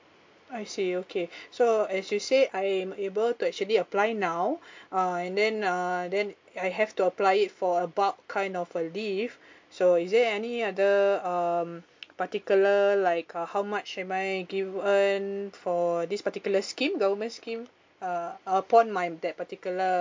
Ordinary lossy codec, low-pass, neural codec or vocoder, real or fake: MP3, 48 kbps; 7.2 kHz; none; real